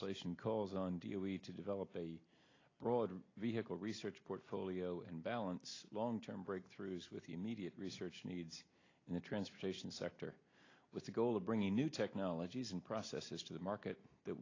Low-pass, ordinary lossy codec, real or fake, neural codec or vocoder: 7.2 kHz; AAC, 32 kbps; real; none